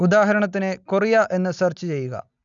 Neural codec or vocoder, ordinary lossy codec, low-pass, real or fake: none; none; 7.2 kHz; real